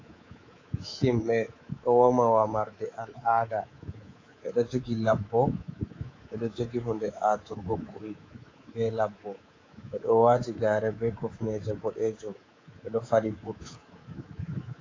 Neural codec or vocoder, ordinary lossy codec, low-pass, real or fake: codec, 24 kHz, 3.1 kbps, DualCodec; AAC, 32 kbps; 7.2 kHz; fake